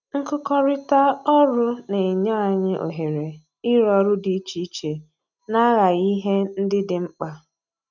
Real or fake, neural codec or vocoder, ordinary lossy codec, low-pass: real; none; none; 7.2 kHz